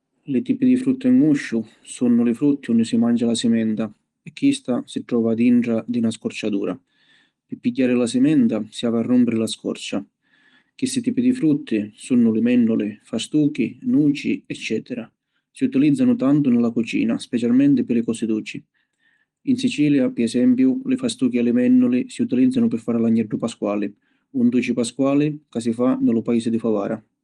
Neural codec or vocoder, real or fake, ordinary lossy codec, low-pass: none; real; Opus, 24 kbps; 9.9 kHz